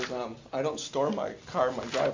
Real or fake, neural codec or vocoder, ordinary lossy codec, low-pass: real; none; MP3, 48 kbps; 7.2 kHz